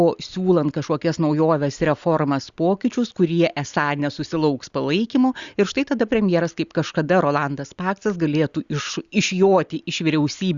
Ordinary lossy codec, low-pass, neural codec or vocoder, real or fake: Opus, 64 kbps; 7.2 kHz; none; real